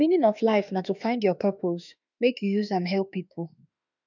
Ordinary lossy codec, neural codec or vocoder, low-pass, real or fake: none; autoencoder, 48 kHz, 32 numbers a frame, DAC-VAE, trained on Japanese speech; 7.2 kHz; fake